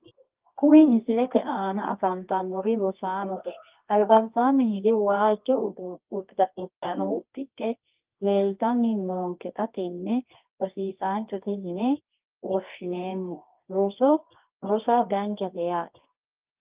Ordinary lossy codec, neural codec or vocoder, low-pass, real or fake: Opus, 32 kbps; codec, 24 kHz, 0.9 kbps, WavTokenizer, medium music audio release; 3.6 kHz; fake